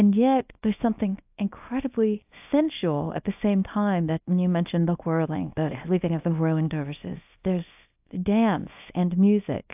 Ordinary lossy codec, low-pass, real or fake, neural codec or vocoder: AAC, 32 kbps; 3.6 kHz; fake; codec, 24 kHz, 0.9 kbps, WavTokenizer, small release